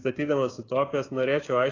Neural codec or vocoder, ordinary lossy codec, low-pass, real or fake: codec, 16 kHz, 6 kbps, DAC; AAC, 32 kbps; 7.2 kHz; fake